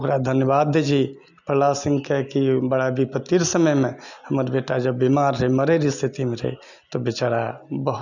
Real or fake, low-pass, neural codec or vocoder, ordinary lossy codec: real; 7.2 kHz; none; none